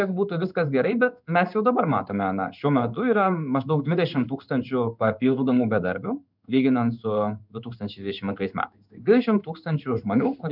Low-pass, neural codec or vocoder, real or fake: 5.4 kHz; codec, 16 kHz in and 24 kHz out, 1 kbps, XY-Tokenizer; fake